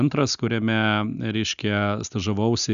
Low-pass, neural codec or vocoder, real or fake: 7.2 kHz; none; real